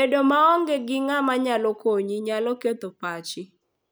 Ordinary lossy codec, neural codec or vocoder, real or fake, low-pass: none; none; real; none